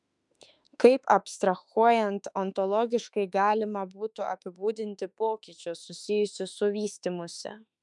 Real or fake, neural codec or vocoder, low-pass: fake; autoencoder, 48 kHz, 32 numbers a frame, DAC-VAE, trained on Japanese speech; 10.8 kHz